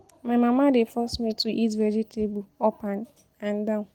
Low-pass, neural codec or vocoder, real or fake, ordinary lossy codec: 19.8 kHz; none; real; Opus, 24 kbps